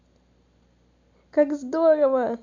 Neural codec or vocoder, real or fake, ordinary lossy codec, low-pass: none; real; AAC, 48 kbps; 7.2 kHz